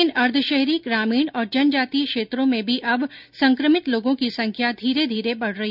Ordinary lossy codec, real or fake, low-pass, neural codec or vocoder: none; real; 5.4 kHz; none